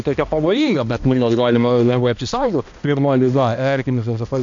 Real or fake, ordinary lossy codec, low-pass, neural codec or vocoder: fake; AAC, 64 kbps; 7.2 kHz; codec, 16 kHz, 1 kbps, X-Codec, HuBERT features, trained on balanced general audio